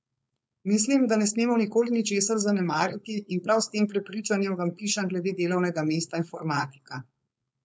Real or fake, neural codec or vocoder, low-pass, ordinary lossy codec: fake; codec, 16 kHz, 4.8 kbps, FACodec; none; none